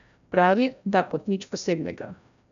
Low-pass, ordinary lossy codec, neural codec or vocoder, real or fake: 7.2 kHz; AAC, 96 kbps; codec, 16 kHz, 0.5 kbps, FreqCodec, larger model; fake